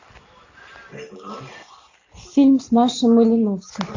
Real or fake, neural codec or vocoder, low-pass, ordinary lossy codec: real; none; 7.2 kHz; AAC, 48 kbps